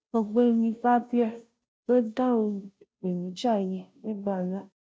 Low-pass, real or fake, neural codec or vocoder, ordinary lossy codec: none; fake; codec, 16 kHz, 0.5 kbps, FunCodec, trained on Chinese and English, 25 frames a second; none